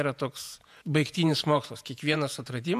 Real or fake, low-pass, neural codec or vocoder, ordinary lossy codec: fake; 14.4 kHz; vocoder, 48 kHz, 128 mel bands, Vocos; AAC, 96 kbps